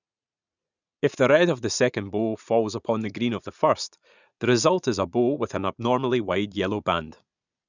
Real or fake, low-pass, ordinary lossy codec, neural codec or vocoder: fake; 7.2 kHz; none; vocoder, 44.1 kHz, 80 mel bands, Vocos